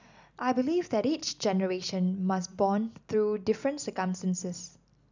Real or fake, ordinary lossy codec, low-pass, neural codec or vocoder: real; none; 7.2 kHz; none